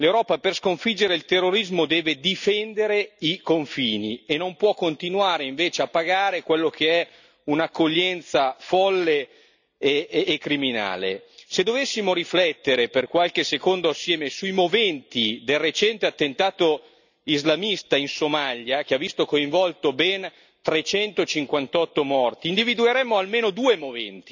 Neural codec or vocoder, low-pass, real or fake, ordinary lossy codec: none; 7.2 kHz; real; none